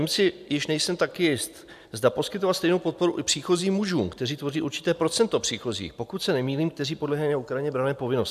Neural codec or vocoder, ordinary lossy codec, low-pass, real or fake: none; AAC, 96 kbps; 14.4 kHz; real